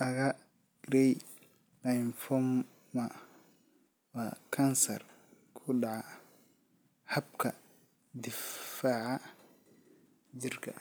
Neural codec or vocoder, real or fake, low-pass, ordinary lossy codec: none; real; none; none